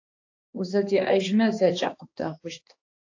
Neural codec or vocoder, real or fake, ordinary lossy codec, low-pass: codec, 16 kHz, 2 kbps, X-Codec, HuBERT features, trained on balanced general audio; fake; AAC, 48 kbps; 7.2 kHz